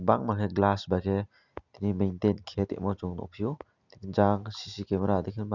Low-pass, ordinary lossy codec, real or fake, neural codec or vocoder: 7.2 kHz; none; real; none